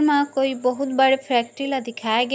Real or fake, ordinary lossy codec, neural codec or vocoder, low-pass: real; none; none; none